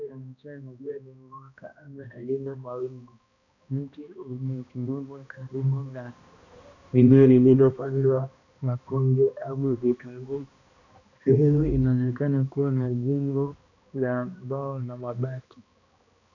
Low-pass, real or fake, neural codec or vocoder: 7.2 kHz; fake; codec, 16 kHz, 1 kbps, X-Codec, HuBERT features, trained on balanced general audio